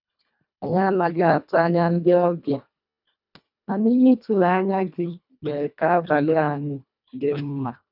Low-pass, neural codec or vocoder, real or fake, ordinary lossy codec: 5.4 kHz; codec, 24 kHz, 1.5 kbps, HILCodec; fake; none